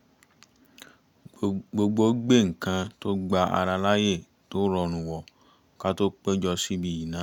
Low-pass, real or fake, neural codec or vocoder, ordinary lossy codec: 19.8 kHz; real; none; none